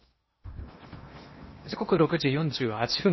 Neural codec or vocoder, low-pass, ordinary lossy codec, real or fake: codec, 16 kHz in and 24 kHz out, 0.8 kbps, FocalCodec, streaming, 65536 codes; 7.2 kHz; MP3, 24 kbps; fake